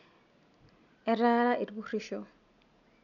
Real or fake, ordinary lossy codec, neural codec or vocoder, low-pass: real; none; none; 7.2 kHz